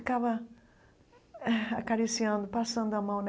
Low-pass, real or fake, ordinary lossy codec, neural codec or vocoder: none; real; none; none